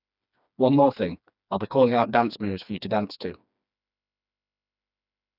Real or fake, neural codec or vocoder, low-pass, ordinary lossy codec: fake; codec, 16 kHz, 2 kbps, FreqCodec, smaller model; 5.4 kHz; none